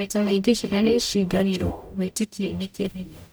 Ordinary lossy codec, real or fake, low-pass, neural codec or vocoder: none; fake; none; codec, 44.1 kHz, 0.9 kbps, DAC